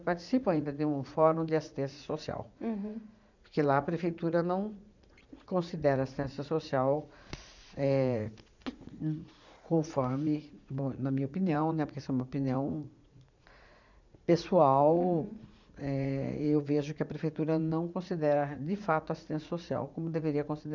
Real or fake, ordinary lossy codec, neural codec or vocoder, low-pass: fake; none; vocoder, 44.1 kHz, 80 mel bands, Vocos; 7.2 kHz